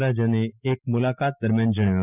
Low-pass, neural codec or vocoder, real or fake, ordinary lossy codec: 3.6 kHz; none; real; none